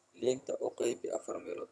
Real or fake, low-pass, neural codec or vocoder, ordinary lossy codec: fake; none; vocoder, 22.05 kHz, 80 mel bands, HiFi-GAN; none